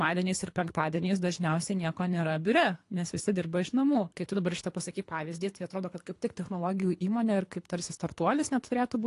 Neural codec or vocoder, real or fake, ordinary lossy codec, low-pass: codec, 24 kHz, 3 kbps, HILCodec; fake; AAC, 48 kbps; 10.8 kHz